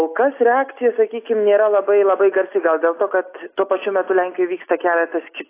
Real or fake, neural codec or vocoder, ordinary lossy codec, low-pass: real; none; AAC, 24 kbps; 3.6 kHz